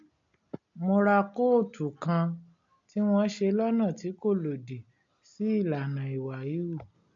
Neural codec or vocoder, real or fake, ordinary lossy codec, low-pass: none; real; MP3, 48 kbps; 7.2 kHz